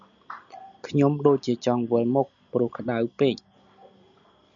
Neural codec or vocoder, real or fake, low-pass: none; real; 7.2 kHz